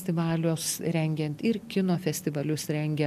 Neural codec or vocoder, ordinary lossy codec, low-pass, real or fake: none; MP3, 96 kbps; 14.4 kHz; real